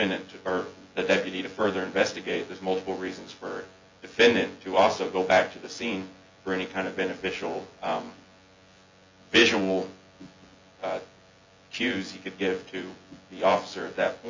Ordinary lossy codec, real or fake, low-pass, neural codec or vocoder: MP3, 64 kbps; fake; 7.2 kHz; vocoder, 24 kHz, 100 mel bands, Vocos